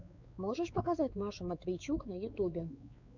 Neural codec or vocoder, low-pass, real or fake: codec, 16 kHz, 4 kbps, X-Codec, WavLM features, trained on Multilingual LibriSpeech; 7.2 kHz; fake